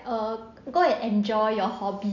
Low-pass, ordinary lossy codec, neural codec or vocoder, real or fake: 7.2 kHz; none; none; real